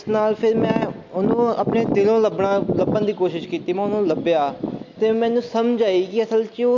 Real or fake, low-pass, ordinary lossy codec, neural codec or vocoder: real; 7.2 kHz; MP3, 64 kbps; none